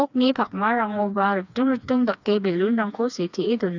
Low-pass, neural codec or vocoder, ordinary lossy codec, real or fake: 7.2 kHz; codec, 16 kHz, 2 kbps, FreqCodec, smaller model; none; fake